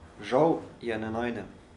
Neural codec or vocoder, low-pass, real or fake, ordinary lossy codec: none; 10.8 kHz; real; none